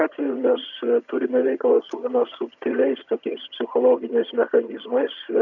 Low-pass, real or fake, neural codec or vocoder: 7.2 kHz; fake; vocoder, 22.05 kHz, 80 mel bands, HiFi-GAN